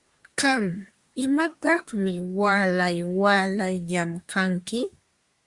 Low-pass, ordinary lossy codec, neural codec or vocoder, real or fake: 10.8 kHz; Opus, 64 kbps; codec, 24 kHz, 1 kbps, SNAC; fake